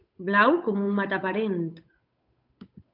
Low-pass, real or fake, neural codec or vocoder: 5.4 kHz; fake; codec, 16 kHz, 8 kbps, FunCodec, trained on Chinese and English, 25 frames a second